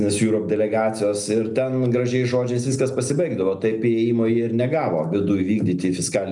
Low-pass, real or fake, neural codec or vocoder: 10.8 kHz; real; none